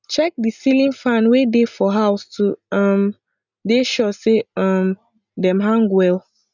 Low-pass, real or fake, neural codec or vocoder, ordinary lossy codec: 7.2 kHz; real; none; none